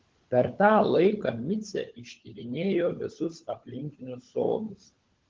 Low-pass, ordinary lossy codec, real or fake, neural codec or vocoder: 7.2 kHz; Opus, 16 kbps; fake; codec, 16 kHz, 16 kbps, FunCodec, trained on LibriTTS, 50 frames a second